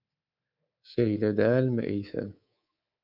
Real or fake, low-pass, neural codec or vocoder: fake; 5.4 kHz; codec, 24 kHz, 3.1 kbps, DualCodec